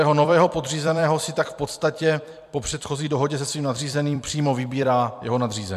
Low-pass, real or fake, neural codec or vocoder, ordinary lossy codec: 14.4 kHz; fake; vocoder, 44.1 kHz, 128 mel bands every 256 samples, BigVGAN v2; MP3, 96 kbps